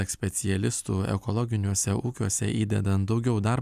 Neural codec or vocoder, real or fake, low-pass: none; real; 14.4 kHz